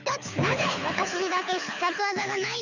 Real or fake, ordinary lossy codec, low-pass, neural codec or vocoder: fake; none; 7.2 kHz; codec, 24 kHz, 6 kbps, HILCodec